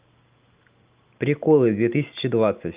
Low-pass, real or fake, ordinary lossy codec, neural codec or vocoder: 3.6 kHz; real; Opus, 24 kbps; none